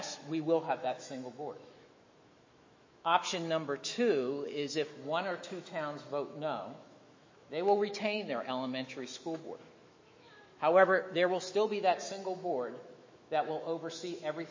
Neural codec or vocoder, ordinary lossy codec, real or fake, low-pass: autoencoder, 48 kHz, 128 numbers a frame, DAC-VAE, trained on Japanese speech; MP3, 32 kbps; fake; 7.2 kHz